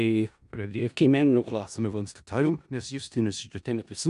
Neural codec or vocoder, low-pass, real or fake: codec, 16 kHz in and 24 kHz out, 0.4 kbps, LongCat-Audio-Codec, four codebook decoder; 10.8 kHz; fake